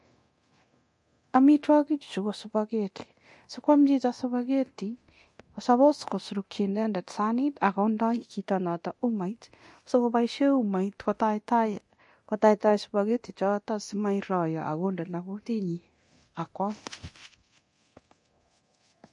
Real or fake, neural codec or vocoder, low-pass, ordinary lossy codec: fake; codec, 24 kHz, 0.9 kbps, DualCodec; 10.8 kHz; MP3, 48 kbps